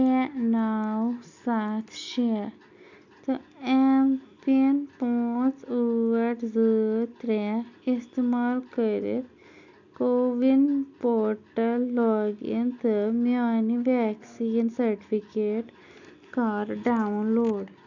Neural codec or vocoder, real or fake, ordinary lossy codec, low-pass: none; real; none; 7.2 kHz